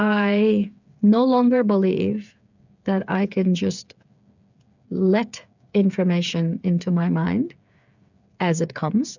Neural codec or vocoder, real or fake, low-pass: codec, 16 kHz, 8 kbps, FreqCodec, smaller model; fake; 7.2 kHz